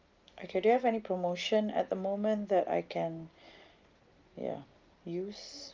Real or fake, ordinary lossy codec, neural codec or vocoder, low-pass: real; Opus, 32 kbps; none; 7.2 kHz